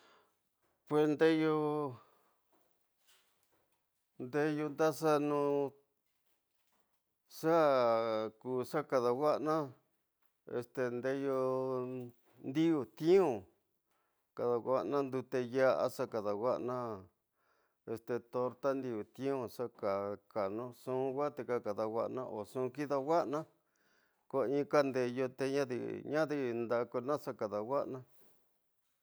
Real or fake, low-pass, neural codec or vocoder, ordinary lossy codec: real; none; none; none